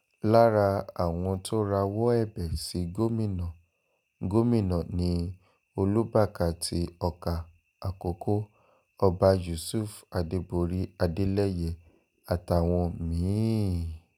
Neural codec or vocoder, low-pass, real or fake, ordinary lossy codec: none; 19.8 kHz; real; none